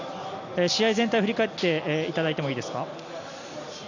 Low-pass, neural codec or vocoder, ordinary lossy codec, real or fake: 7.2 kHz; none; none; real